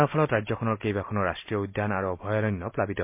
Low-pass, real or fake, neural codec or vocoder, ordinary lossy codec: 3.6 kHz; real; none; MP3, 32 kbps